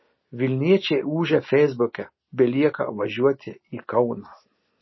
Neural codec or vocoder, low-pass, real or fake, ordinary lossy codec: none; 7.2 kHz; real; MP3, 24 kbps